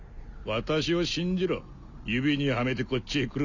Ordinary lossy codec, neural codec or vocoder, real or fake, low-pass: none; none; real; 7.2 kHz